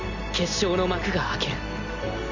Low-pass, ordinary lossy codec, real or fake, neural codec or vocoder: 7.2 kHz; none; real; none